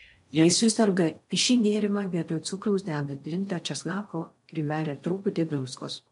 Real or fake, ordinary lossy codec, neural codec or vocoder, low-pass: fake; MP3, 64 kbps; codec, 16 kHz in and 24 kHz out, 0.6 kbps, FocalCodec, streaming, 4096 codes; 10.8 kHz